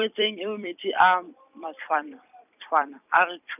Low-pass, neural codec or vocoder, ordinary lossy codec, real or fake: 3.6 kHz; vocoder, 44.1 kHz, 128 mel bands every 256 samples, BigVGAN v2; none; fake